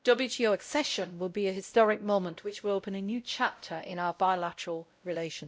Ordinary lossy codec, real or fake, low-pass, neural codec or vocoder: none; fake; none; codec, 16 kHz, 0.5 kbps, X-Codec, WavLM features, trained on Multilingual LibriSpeech